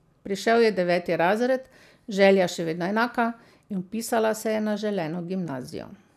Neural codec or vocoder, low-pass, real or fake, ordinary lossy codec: none; 14.4 kHz; real; none